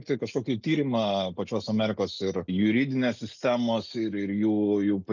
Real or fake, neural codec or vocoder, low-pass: real; none; 7.2 kHz